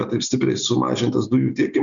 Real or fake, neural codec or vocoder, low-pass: real; none; 7.2 kHz